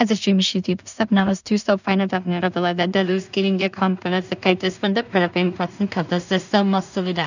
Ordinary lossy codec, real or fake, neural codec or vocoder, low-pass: none; fake; codec, 16 kHz in and 24 kHz out, 0.4 kbps, LongCat-Audio-Codec, two codebook decoder; 7.2 kHz